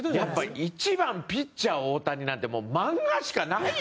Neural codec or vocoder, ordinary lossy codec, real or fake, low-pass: none; none; real; none